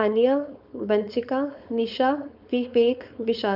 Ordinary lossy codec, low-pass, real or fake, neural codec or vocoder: none; 5.4 kHz; fake; codec, 16 kHz, 4.8 kbps, FACodec